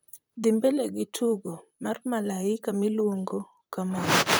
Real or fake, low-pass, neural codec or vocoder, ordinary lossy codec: fake; none; vocoder, 44.1 kHz, 128 mel bands, Pupu-Vocoder; none